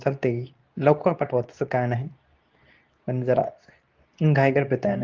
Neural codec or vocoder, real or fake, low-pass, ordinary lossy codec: codec, 24 kHz, 0.9 kbps, WavTokenizer, medium speech release version 2; fake; 7.2 kHz; Opus, 24 kbps